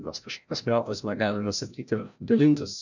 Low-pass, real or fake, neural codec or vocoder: 7.2 kHz; fake; codec, 16 kHz, 0.5 kbps, FreqCodec, larger model